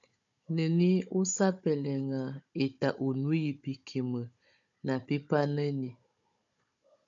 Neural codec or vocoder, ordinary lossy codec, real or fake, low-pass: codec, 16 kHz, 16 kbps, FunCodec, trained on Chinese and English, 50 frames a second; MP3, 64 kbps; fake; 7.2 kHz